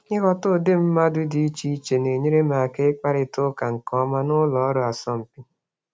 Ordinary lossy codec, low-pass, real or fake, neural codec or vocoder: none; none; real; none